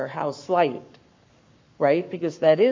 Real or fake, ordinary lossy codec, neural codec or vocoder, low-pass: fake; MP3, 48 kbps; codec, 44.1 kHz, 7.8 kbps, Pupu-Codec; 7.2 kHz